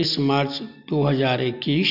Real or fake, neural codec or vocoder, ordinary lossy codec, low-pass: real; none; none; 5.4 kHz